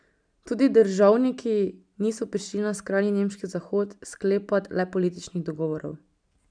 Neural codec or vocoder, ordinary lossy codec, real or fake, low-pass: none; none; real; 9.9 kHz